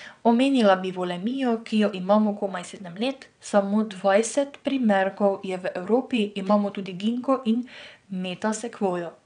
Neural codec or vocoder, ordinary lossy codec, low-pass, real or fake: vocoder, 22.05 kHz, 80 mel bands, WaveNeXt; none; 9.9 kHz; fake